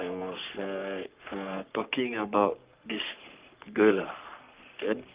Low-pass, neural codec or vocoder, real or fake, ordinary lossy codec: 3.6 kHz; codec, 44.1 kHz, 3.4 kbps, Pupu-Codec; fake; Opus, 24 kbps